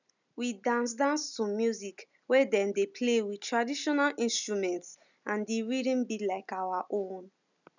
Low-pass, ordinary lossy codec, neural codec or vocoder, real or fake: 7.2 kHz; none; none; real